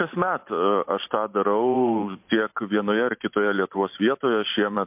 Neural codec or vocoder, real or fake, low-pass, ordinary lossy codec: vocoder, 44.1 kHz, 128 mel bands every 512 samples, BigVGAN v2; fake; 3.6 kHz; MP3, 32 kbps